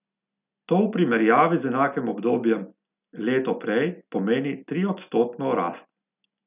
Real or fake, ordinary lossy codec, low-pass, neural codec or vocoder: real; none; 3.6 kHz; none